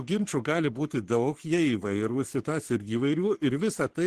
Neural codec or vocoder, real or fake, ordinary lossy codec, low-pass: codec, 44.1 kHz, 3.4 kbps, Pupu-Codec; fake; Opus, 16 kbps; 14.4 kHz